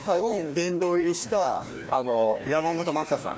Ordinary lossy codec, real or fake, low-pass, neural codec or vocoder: none; fake; none; codec, 16 kHz, 1 kbps, FreqCodec, larger model